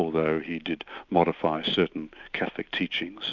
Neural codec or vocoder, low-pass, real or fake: none; 7.2 kHz; real